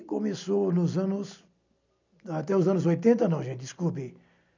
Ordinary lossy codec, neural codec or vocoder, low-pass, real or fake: none; none; 7.2 kHz; real